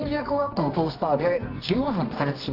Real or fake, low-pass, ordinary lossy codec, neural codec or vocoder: fake; 5.4 kHz; AAC, 32 kbps; codec, 24 kHz, 0.9 kbps, WavTokenizer, medium music audio release